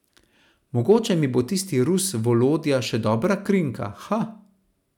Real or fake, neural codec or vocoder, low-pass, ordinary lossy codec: real; none; 19.8 kHz; none